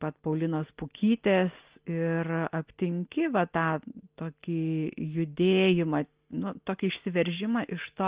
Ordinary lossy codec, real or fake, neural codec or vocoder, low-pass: Opus, 32 kbps; real; none; 3.6 kHz